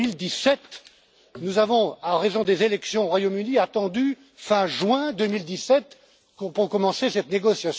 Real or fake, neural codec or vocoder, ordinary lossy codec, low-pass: real; none; none; none